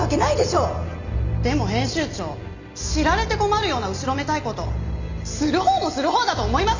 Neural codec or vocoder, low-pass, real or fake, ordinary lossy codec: none; 7.2 kHz; real; none